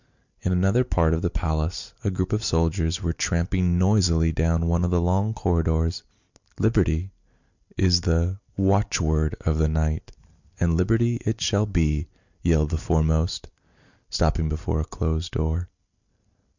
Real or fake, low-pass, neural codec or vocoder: real; 7.2 kHz; none